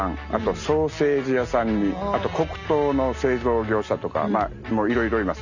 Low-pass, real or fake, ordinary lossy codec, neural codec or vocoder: 7.2 kHz; real; none; none